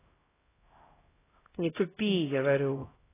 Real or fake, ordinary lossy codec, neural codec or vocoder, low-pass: fake; AAC, 16 kbps; codec, 16 kHz, 0.5 kbps, X-Codec, HuBERT features, trained on LibriSpeech; 3.6 kHz